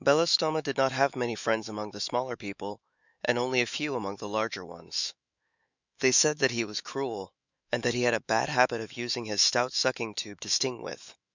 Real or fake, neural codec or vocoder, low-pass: fake; autoencoder, 48 kHz, 128 numbers a frame, DAC-VAE, trained on Japanese speech; 7.2 kHz